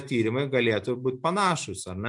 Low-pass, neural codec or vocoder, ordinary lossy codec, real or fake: 10.8 kHz; none; MP3, 64 kbps; real